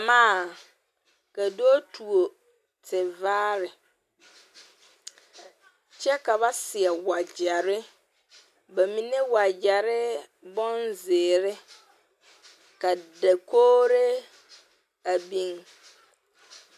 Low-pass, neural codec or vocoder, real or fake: 14.4 kHz; none; real